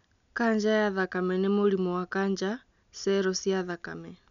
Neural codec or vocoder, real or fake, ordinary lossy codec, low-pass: none; real; none; 7.2 kHz